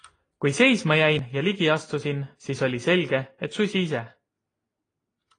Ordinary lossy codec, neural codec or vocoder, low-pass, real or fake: AAC, 32 kbps; none; 9.9 kHz; real